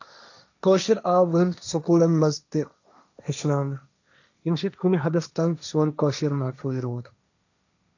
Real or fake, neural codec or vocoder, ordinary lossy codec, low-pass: fake; codec, 16 kHz, 1.1 kbps, Voila-Tokenizer; none; 7.2 kHz